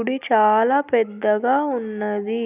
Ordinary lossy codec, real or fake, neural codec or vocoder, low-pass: none; real; none; 3.6 kHz